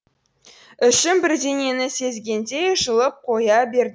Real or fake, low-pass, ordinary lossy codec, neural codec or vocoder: real; none; none; none